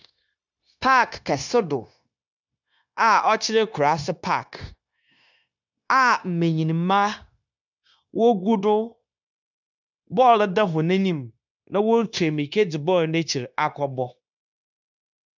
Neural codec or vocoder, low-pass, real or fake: codec, 16 kHz, 0.9 kbps, LongCat-Audio-Codec; 7.2 kHz; fake